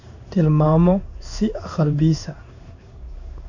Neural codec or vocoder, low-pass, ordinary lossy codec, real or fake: codec, 16 kHz in and 24 kHz out, 1 kbps, XY-Tokenizer; 7.2 kHz; none; fake